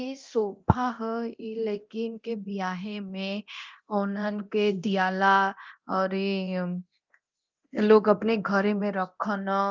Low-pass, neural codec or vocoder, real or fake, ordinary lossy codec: 7.2 kHz; codec, 24 kHz, 0.9 kbps, DualCodec; fake; Opus, 24 kbps